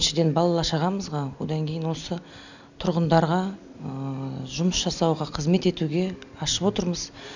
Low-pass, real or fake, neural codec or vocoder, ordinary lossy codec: 7.2 kHz; real; none; none